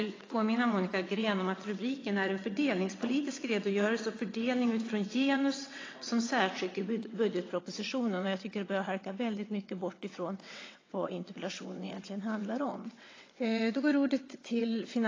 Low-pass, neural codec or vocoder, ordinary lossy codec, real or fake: 7.2 kHz; vocoder, 22.05 kHz, 80 mel bands, WaveNeXt; AAC, 32 kbps; fake